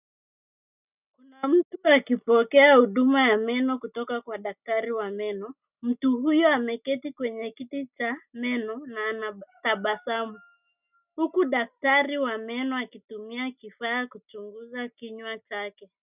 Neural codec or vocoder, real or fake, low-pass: none; real; 3.6 kHz